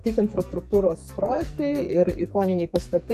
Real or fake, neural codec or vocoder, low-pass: fake; codec, 44.1 kHz, 2.6 kbps, SNAC; 14.4 kHz